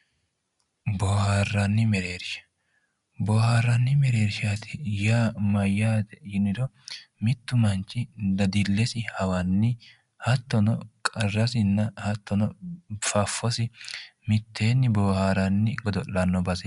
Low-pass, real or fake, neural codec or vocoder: 10.8 kHz; real; none